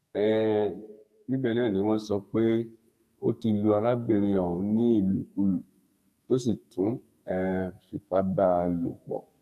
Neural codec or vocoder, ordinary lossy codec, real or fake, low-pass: codec, 44.1 kHz, 2.6 kbps, SNAC; none; fake; 14.4 kHz